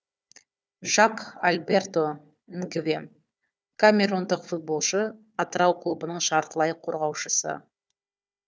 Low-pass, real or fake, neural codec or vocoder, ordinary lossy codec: none; fake; codec, 16 kHz, 4 kbps, FunCodec, trained on Chinese and English, 50 frames a second; none